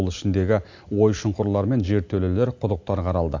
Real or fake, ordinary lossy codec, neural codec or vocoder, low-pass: real; AAC, 48 kbps; none; 7.2 kHz